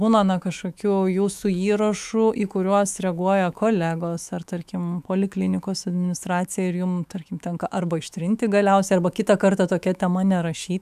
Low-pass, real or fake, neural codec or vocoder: 14.4 kHz; fake; autoencoder, 48 kHz, 128 numbers a frame, DAC-VAE, trained on Japanese speech